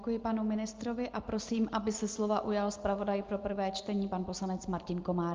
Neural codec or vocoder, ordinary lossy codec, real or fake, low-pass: none; Opus, 32 kbps; real; 7.2 kHz